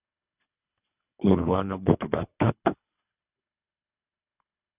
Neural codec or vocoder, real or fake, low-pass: codec, 24 kHz, 3 kbps, HILCodec; fake; 3.6 kHz